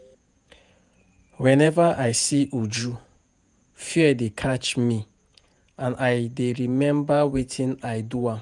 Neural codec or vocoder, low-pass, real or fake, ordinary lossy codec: vocoder, 48 kHz, 128 mel bands, Vocos; 10.8 kHz; fake; none